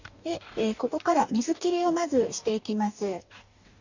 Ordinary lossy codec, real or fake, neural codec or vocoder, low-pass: none; fake; codec, 44.1 kHz, 2.6 kbps, DAC; 7.2 kHz